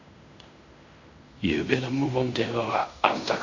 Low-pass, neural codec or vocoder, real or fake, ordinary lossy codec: 7.2 kHz; codec, 16 kHz in and 24 kHz out, 0.9 kbps, LongCat-Audio-Codec, fine tuned four codebook decoder; fake; MP3, 64 kbps